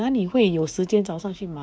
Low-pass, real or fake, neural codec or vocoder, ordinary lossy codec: none; fake; codec, 16 kHz, 6 kbps, DAC; none